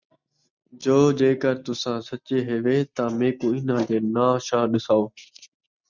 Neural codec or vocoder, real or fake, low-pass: none; real; 7.2 kHz